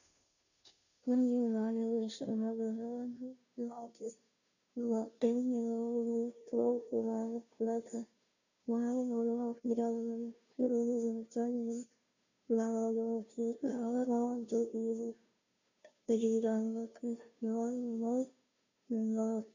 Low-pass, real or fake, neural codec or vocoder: 7.2 kHz; fake; codec, 16 kHz, 0.5 kbps, FunCodec, trained on Chinese and English, 25 frames a second